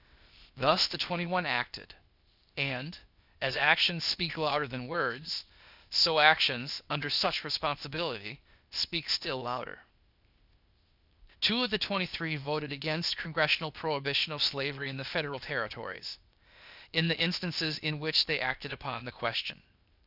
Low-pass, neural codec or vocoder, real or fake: 5.4 kHz; codec, 16 kHz, 0.8 kbps, ZipCodec; fake